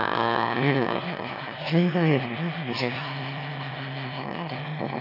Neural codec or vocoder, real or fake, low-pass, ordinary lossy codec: autoencoder, 22.05 kHz, a latent of 192 numbers a frame, VITS, trained on one speaker; fake; 5.4 kHz; none